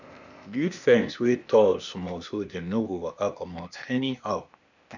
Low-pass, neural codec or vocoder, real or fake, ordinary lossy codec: 7.2 kHz; codec, 16 kHz, 0.8 kbps, ZipCodec; fake; none